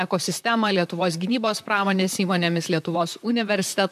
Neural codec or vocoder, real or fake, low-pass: vocoder, 44.1 kHz, 128 mel bands, Pupu-Vocoder; fake; 14.4 kHz